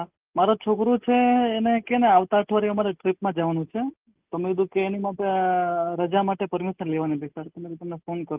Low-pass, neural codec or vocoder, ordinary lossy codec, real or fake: 3.6 kHz; none; Opus, 24 kbps; real